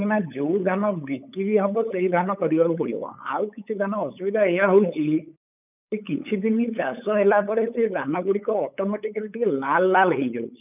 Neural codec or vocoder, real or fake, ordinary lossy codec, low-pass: codec, 16 kHz, 8 kbps, FunCodec, trained on LibriTTS, 25 frames a second; fake; none; 3.6 kHz